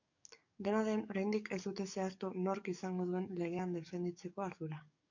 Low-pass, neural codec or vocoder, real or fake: 7.2 kHz; codec, 44.1 kHz, 7.8 kbps, DAC; fake